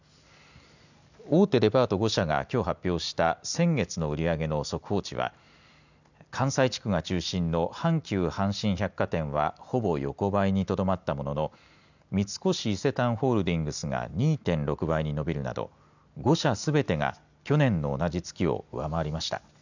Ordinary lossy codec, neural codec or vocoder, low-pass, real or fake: none; none; 7.2 kHz; real